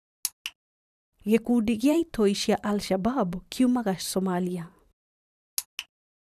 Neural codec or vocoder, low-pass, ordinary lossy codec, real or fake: vocoder, 44.1 kHz, 128 mel bands every 512 samples, BigVGAN v2; 14.4 kHz; none; fake